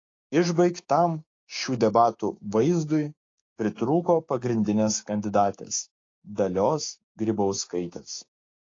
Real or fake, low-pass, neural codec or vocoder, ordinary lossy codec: fake; 7.2 kHz; codec, 16 kHz, 6 kbps, DAC; AAC, 32 kbps